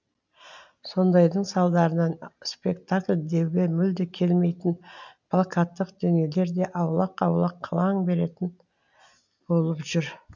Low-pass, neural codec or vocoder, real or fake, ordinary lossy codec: 7.2 kHz; none; real; none